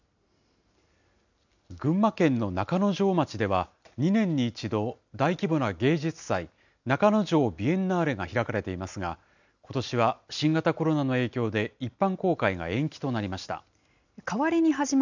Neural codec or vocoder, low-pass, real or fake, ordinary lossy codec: none; 7.2 kHz; real; none